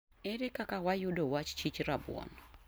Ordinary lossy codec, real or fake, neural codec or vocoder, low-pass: none; real; none; none